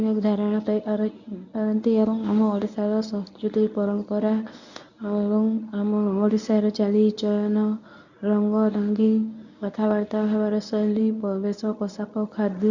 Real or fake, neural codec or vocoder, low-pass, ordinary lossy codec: fake; codec, 24 kHz, 0.9 kbps, WavTokenizer, medium speech release version 1; 7.2 kHz; none